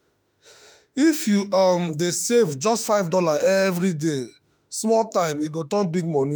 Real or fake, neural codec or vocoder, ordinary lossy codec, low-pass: fake; autoencoder, 48 kHz, 32 numbers a frame, DAC-VAE, trained on Japanese speech; none; none